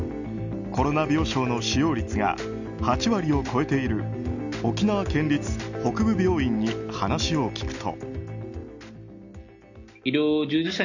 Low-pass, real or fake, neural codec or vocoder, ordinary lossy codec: 7.2 kHz; real; none; none